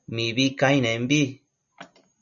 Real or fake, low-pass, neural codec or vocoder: real; 7.2 kHz; none